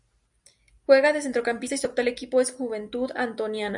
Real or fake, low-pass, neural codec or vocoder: real; 10.8 kHz; none